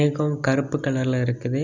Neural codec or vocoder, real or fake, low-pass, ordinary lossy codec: none; real; 7.2 kHz; none